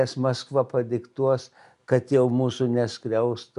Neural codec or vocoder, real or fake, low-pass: none; real; 10.8 kHz